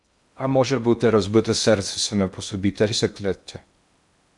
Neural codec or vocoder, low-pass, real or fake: codec, 16 kHz in and 24 kHz out, 0.6 kbps, FocalCodec, streaming, 2048 codes; 10.8 kHz; fake